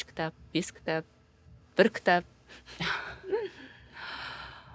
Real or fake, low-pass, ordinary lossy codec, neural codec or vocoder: real; none; none; none